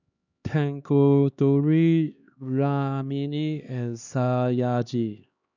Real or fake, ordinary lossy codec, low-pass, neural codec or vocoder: fake; none; 7.2 kHz; codec, 16 kHz, 2 kbps, X-Codec, HuBERT features, trained on LibriSpeech